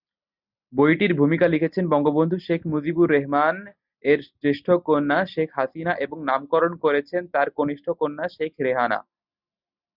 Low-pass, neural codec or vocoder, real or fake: 5.4 kHz; none; real